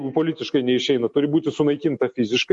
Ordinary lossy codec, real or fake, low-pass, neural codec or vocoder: MP3, 48 kbps; real; 10.8 kHz; none